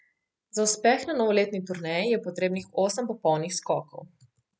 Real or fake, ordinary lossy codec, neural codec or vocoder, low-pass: real; none; none; none